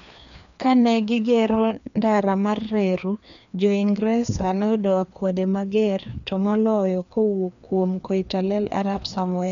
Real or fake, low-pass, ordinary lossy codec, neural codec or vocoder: fake; 7.2 kHz; none; codec, 16 kHz, 2 kbps, FreqCodec, larger model